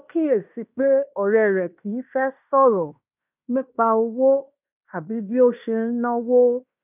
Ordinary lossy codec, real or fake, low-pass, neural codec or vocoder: none; fake; 3.6 kHz; codec, 16 kHz in and 24 kHz out, 0.9 kbps, LongCat-Audio-Codec, fine tuned four codebook decoder